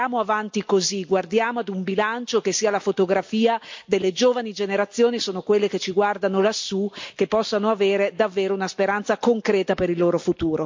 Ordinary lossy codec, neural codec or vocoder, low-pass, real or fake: MP3, 48 kbps; none; 7.2 kHz; real